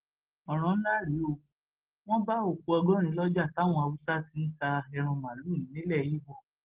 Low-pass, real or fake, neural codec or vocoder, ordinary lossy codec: 3.6 kHz; real; none; Opus, 16 kbps